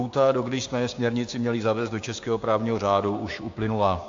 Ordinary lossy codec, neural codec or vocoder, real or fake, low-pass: AAC, 48 kbps; codec, 16 kHz, 6 kbps, DAC; fake; 7.2 kHz